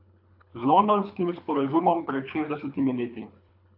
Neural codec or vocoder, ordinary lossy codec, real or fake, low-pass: codec, 24 kHz, 3 kbps, HILCodec; AAC, 48 kbps; fake; 5.4 kHz